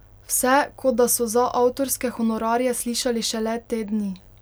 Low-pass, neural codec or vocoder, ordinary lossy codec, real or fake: none; none; none; real